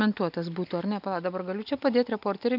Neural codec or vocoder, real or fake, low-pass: none; real; 5.4 kHz